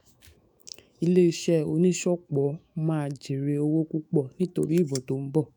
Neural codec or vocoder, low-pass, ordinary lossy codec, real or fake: autoencoder, 48 kHz, 128 numbers a frame, DAC-VAE, trained on Japanese speech; none; none; fake